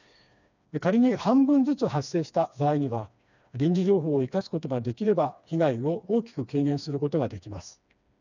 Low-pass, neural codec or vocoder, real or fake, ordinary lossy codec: 7.2 kHz; codec, 16 kHz, 2 kbps, FreqCodec, smaller model; fake; none